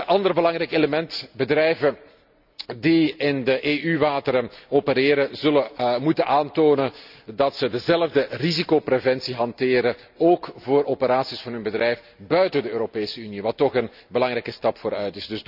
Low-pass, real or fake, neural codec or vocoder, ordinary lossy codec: 5.4 kHz; real; none; none